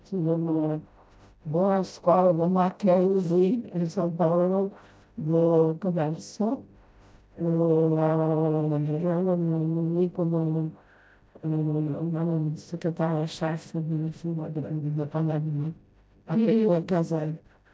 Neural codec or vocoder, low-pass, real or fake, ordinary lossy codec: codec, 16 kHz, 0.5 kbps, FreqCodec, smaller model; none; fake; none